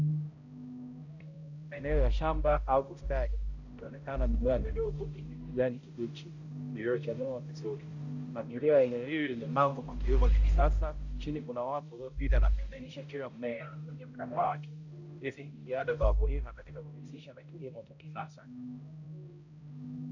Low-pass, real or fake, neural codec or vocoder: 7.2 kHz; fake; codec, 16 kHz, 0.5 kbps, X-Codec, HuBERT features, trained on balanced general audio